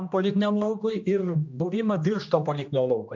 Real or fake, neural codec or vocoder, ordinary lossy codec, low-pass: fake; codec, 16 kHz, 1 kbps, X-Codec, HuBERT features, trained on general audio; MP3, 64 kbps; 7.2 kHz